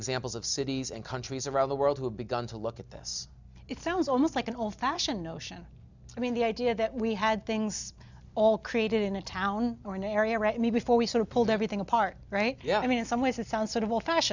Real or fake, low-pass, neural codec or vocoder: real; 7.2 kHz; none